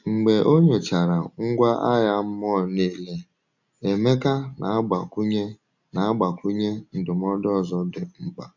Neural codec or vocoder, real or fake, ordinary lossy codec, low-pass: none; real; none; 7.2 kHz